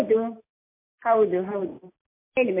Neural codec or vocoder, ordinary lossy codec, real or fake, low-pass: none; MP3, 24 kbps; real; 3.6 kHz